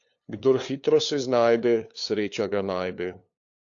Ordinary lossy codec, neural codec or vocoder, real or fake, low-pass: AAC, 48 kbps; codec, 16 kHz, 2 kbps, FunCodec, trained on LibriTTS, 25 frames a second; fake; 7.2 kHz